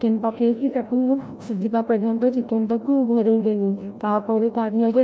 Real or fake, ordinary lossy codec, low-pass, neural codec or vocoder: fake; none; none; codec, 16 kHz, 0.5 kbps, FreqCodec, larger model